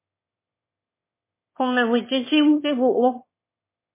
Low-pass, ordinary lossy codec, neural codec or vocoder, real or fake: 3.6 kHz; MP3, 16 kbps; autoencoder, 22.05 kHz, a latent of 192 numbers a frame, VITS, trained on one speaker; fake